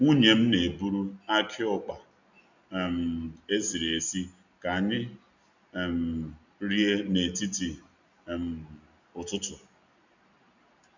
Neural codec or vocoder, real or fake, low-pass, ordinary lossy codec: none; real; 7.2 kHz; none